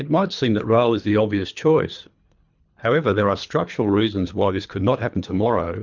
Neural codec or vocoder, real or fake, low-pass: codec, 24 kHz, 3 kbps, HILCodec; fake; 7.2 kHz